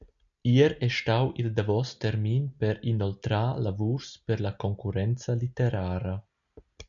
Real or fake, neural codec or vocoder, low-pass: real; none; 7.2 kHz